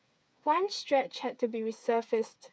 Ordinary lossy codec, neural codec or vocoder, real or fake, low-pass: none; codec, 16 kHz, 8 kbps, FreqCodec, smaller model; fake; none